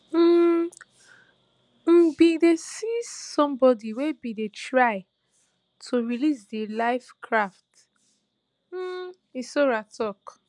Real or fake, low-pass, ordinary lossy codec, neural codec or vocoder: real; 10.8 kHz; none; none